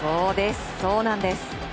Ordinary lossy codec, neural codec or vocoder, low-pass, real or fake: none; none; none; real